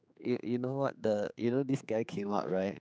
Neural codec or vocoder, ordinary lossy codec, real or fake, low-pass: codec, 16 kHz, 4 kbps, X-Codec, HuBERT features, trained on general audio; none; fake; none